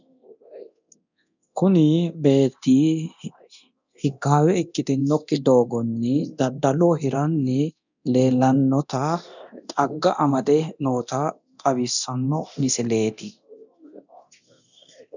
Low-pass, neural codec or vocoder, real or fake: 7.2 kHz; codec, 24 kHz, 0.9 kbps, DualCodec; fake